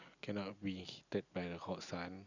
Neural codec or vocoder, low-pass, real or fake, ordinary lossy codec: none; 7.2 kHz; real; none